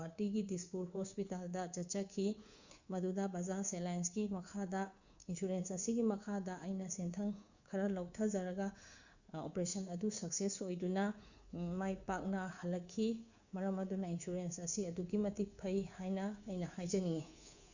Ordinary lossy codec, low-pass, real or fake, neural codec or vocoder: Opus, 64 kbps; 7.2 kHz; fake; codec, 24 kHz, 3.1 kbps, DualCodec